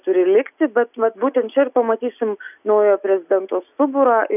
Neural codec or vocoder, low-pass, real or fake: none; 3.6 kHz; real